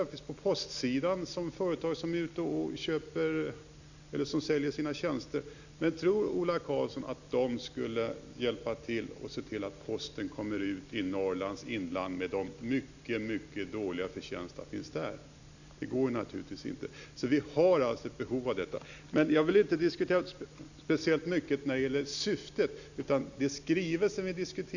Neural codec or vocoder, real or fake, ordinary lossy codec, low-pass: none; real; none; 7.2 kHz